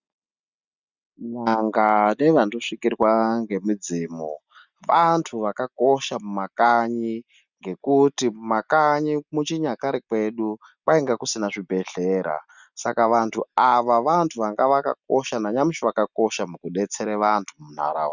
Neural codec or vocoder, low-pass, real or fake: none; 7.2 kHz; real